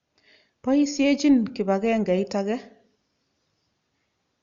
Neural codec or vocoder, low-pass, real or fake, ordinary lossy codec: none; 7.2 kHz; real; Opus, 64 kbps